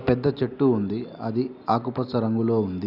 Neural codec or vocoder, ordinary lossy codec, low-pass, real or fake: none; none; 5.4 kHz; real